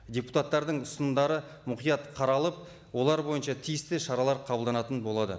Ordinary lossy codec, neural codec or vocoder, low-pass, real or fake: none; none; none; real